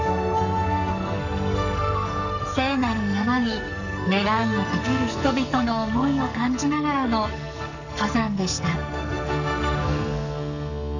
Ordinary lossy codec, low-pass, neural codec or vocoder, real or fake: none; 7.2 kHz; codec, 44.1 kHz, 2.6 kbps, SNAC; fake